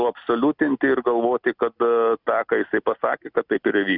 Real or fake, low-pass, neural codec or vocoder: real; 5.4 kHz; none